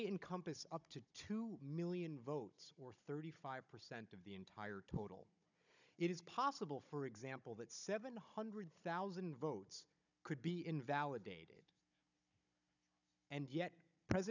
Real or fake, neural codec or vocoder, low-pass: fake; codec, 16 kHz, 16 kbps, FunCodec, trained on Chinese and English, 50 frames a second; 7.2 kHz